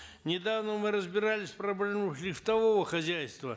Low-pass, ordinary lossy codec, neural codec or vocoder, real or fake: none; none; none; real